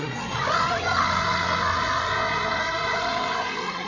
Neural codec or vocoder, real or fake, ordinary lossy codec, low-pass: codec, 16 kHz, 8 kbps, FreqCodec, larger model; fake; Opus, 64 kbps; 7.2 kHz